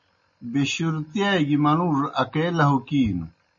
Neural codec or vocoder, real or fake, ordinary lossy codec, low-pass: none; real; MP3, 32 kbps; 7.2 kHz